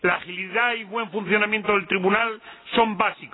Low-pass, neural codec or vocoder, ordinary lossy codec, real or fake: 7.2 kHz; none; AAC, 16 kbps; real